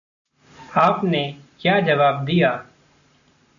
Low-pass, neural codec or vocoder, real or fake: 7.2 kHz; none; real